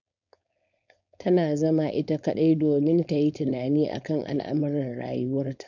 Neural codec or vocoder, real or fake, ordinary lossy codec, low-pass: codec, 16 kHz, 4.8 kbps, FACodec; fake; none; 7.2 kHz